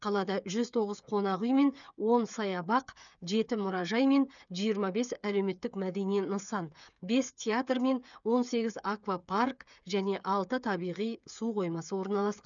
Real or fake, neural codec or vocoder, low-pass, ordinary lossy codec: fake; codec, 16 kHz, 8 kbps, FreqCodec, smaller model; 7.2 kHz; none